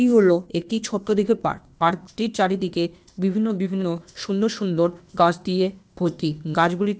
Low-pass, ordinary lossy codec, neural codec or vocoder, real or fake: none; none; codec, 16 kHz, 0.8 kbps, ZipCodec; fake